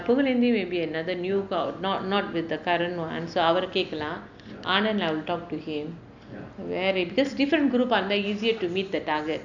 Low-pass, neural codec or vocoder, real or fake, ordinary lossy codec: 7.2 kHz; none; real; none